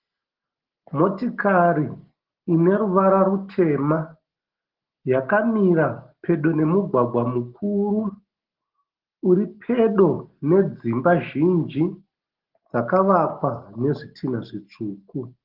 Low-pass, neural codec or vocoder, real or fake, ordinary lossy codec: 5.4 kHz; none; real; Opus, 16 kbps